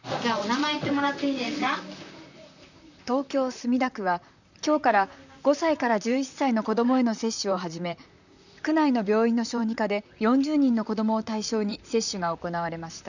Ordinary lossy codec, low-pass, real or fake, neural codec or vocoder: none; 7.2 kHz; fake; vocoder, 44.1 kHz, 128 mel bands, Pupu-Vocoder